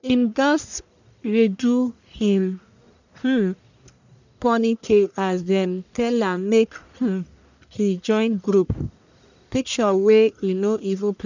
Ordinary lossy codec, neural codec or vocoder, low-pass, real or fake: none; codec, 44.1 kHz, 1.7 kbps, Pupu-Codec; 7.2 kHz; fake